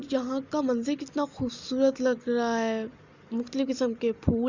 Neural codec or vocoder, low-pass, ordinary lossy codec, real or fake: none; 7.2 kHz; Opus, 64 kbps; real